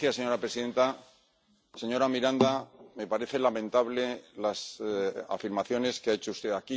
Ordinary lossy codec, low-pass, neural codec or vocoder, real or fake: none; none; none; real